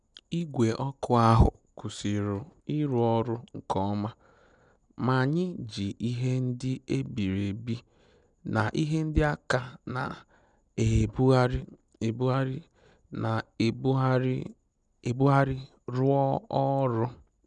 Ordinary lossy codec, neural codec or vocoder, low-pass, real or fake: none; none; 9.9 kHz; real